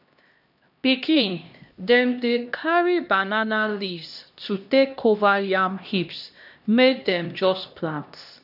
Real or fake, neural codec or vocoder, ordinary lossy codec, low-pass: fake; codec, 16 kHz, 1 kbps, X-Codec, HuBERT features, trained on LibriSpeech; none; 5.4 kHz